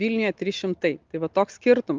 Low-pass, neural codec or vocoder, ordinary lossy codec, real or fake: 7.2 kHz; none; Opus, 32 kbps; real